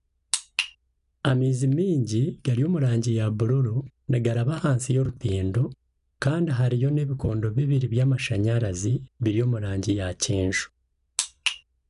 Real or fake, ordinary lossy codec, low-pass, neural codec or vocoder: real; none; 10.8 kHz; none